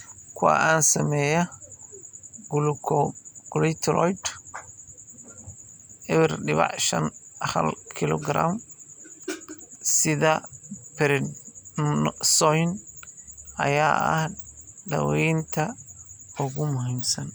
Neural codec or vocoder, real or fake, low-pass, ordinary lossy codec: none; real; none; none